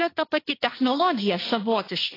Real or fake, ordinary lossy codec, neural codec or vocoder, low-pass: fake; AAC, 24 kbps; codec, 16 kHz, 1.1 kbps, Voila-Tokenizer; 5.4 kHz